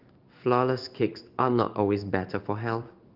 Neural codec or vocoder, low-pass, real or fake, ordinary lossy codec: codec, 16 kHz in and 24 kHz out, 1 kbps, XY-Tokenizer; 5.4 kHz; fake; Opus, 32 kbps